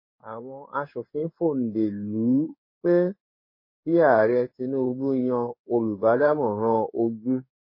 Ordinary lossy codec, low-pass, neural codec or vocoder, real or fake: MP3, 24 kbps; 5.4 kHz; codec, 16 kHz in and 24 kHz out, 1 kbps, XY-Tokenizer; fake